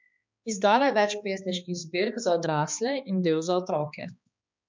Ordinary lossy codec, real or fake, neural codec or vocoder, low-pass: MP3, 64 kbps; fake; codec, 16 kHz, 2 kbps, X-Codec, HuBERT features, trained on balanced general audio; 7.2 kHz